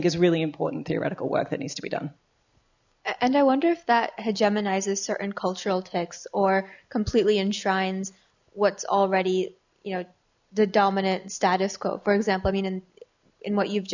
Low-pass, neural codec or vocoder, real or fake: 7.2 kHz; none; real